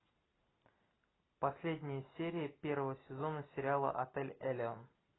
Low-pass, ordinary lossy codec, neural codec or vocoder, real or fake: 7.2 kHz; AAC, 16 kbps; none; real